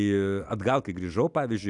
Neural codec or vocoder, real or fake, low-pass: none; real; 10.8 kHz